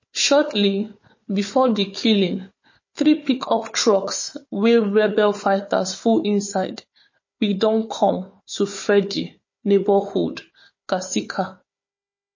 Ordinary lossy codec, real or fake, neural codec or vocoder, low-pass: MP3, 32 kbps; fake; codec, 16 kHz, 4 kbps, FunCodec, trained on Chinese and English, 50 frames a second; 7.2 kHz